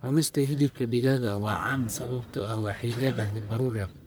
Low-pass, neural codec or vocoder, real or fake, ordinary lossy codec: none; codec, 44.1 kHz, 1.7 kbps, Pupu-Codec; fake; none